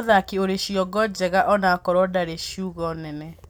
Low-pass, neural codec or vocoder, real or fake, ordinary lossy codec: none; none; real; none